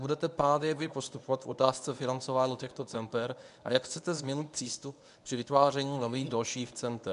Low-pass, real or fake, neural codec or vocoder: 10.8 kHz; fake; codec, 24 kHz, 0.9 kbps, WavTokenizer, medium speech release version 1